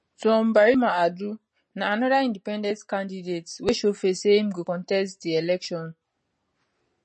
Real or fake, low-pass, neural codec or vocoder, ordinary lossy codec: real; 10.8 kHz; none; MP3, 32 kbps